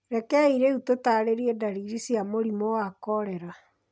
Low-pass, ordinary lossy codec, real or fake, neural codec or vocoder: none; none; real; none